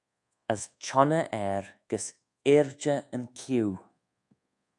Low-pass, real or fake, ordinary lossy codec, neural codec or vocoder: 10.8 kHz; fake; AAC, 64 kbps; codec, 24 kHz, 1.2 kbps, DualCodec